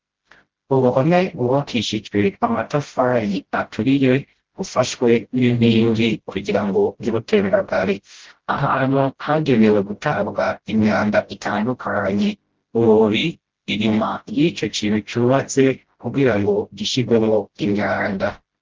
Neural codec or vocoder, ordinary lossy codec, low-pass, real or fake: codec, 16 kHz, 0.5 kbps, FreqCodec, smaller model; Opus, 16 kbps; 7.2 kHz; fake